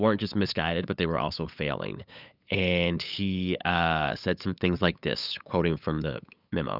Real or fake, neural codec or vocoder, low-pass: fake; codec, 16 kHz, 8 kbps, FunCodec, trained on LibriTTS, 25 frames a second; 5.4 kHz